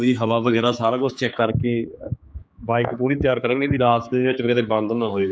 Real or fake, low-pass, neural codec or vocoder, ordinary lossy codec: fake; none; codec, 16 kHz, 4 kbps, X-Codec, HuBERT features, trained on general audio; none